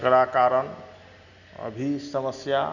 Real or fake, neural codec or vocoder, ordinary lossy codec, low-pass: real; none; none; 7.2 kHz